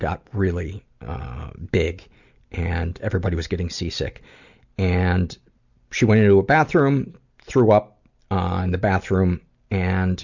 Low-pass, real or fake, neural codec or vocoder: 7.2 kHz; real; none